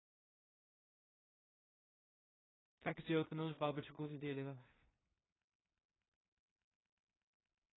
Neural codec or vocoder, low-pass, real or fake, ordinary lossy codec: codec, 16 kHz in and 24 kHz out, 0.4 kbps, LongCat-Audio-Codec, two codebook decoder; 7.2 kHz; fake; AAC, 16 kbps